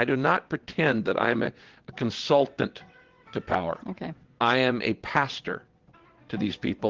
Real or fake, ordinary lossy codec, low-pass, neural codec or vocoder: fake; Opus, 16 kbps; 7.2 kHz; vocoder, 22.05 kHz, 80 mel bands, WaveNeXt